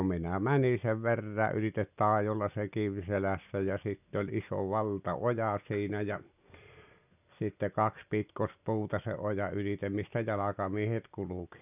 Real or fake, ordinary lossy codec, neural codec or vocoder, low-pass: real; none; none; 3.6 kHz